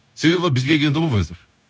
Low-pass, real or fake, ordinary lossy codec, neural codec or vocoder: none; fake; none; codec, 16 kHz, 0.9 kbps, LongCat-Audio-Codec